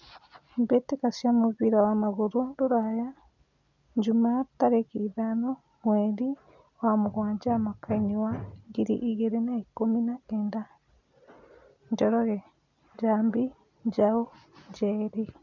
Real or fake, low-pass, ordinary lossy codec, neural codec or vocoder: real; 7.2 kHz; none; none